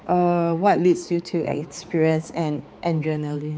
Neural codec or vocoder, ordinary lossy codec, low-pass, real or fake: codec, 16 kHz, 4 kbps, X-Codec, HuBERT features, trained on balanced general audio; none; none; fake